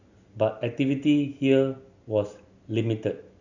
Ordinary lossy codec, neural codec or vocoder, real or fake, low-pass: Opus, 64 kbps; none; real; 7.2 kHz